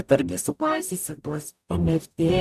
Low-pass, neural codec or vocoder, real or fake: 14.4 kHz; codec, 44.1 kHz, 0.9 kbps, DAC; fake